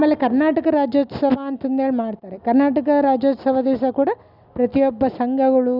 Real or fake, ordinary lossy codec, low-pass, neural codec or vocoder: real; none; 5.4 kHz; none